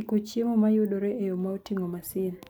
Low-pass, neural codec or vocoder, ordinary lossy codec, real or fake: none; none; none; real